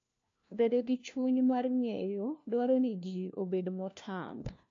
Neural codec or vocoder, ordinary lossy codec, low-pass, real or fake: codec, 16 kHz, 1 kbps, FunCodec, trained on LibriTTS, 50 frames a second; AAC, 48 kbps; 7.2 kHz; fake